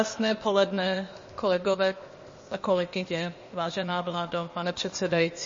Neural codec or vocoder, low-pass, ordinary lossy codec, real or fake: codec, 16 kHz, 0.8 kbps, ZipCodec; 7.2 kHz; MP3, 32 kbps; fake